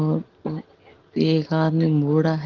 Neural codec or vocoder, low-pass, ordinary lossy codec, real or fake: none; 7.2 kHz; Opus, 16 kbps; real